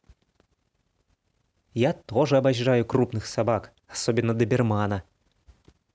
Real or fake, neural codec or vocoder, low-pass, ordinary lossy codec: real; none; none; none